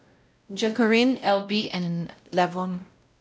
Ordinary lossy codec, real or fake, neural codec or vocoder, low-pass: none; fake; codec, 16 kHz, 0.5 kbps, X-Codec, WavLM features, trained on Multilingual LibriSpeech; none